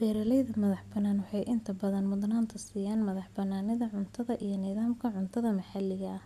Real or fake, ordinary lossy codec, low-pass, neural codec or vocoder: real; none; 14.4 kHz; none